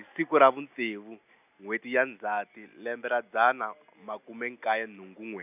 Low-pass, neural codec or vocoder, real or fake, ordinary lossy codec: 3.6 kHz; none; real; none